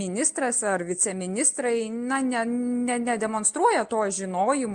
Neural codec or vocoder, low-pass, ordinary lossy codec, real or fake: none; 9.9 kHz; Opus, 24 kbps; real